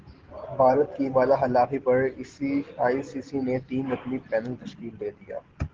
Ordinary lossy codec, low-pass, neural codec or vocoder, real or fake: Opus, 16 kbps; 7.2 kHz; none; real